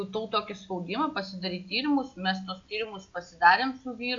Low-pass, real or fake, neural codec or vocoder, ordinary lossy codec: 7.2 kHz; real; none; MP3, 64 kbps